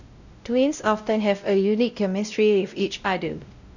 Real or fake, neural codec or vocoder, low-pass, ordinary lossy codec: fake; codec, 16 kHz, 0.5 kbps, X-Codec, WavLM features, trained on Multilingual LibriSpeech; 7.2 kHz; none